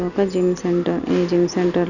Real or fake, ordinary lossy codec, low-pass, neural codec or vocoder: real; none; 7.2 kHz; none